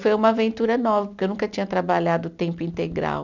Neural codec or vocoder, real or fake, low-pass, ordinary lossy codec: none; real; 7.2 kHz; none